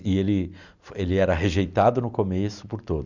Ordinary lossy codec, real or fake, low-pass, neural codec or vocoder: none; real; 7.2 kHz; none